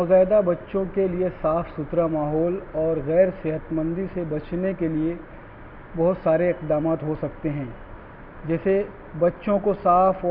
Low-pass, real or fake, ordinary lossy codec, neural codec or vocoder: 5.4 kHz; real; none; none